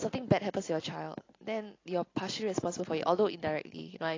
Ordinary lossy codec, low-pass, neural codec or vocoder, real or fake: AAC, 32 kbps; 7.2 kHz; none; real